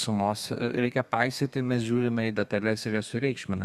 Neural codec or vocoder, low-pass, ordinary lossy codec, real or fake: codec, 32 kHz, 1.9 kbps, SNAC; 14.4 kHz; Opus, 64 kbps; fake